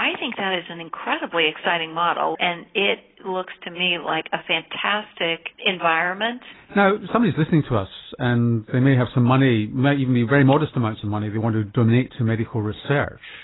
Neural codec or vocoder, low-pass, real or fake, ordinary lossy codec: none; 7.2 kHz; real; AAC, 16 kbps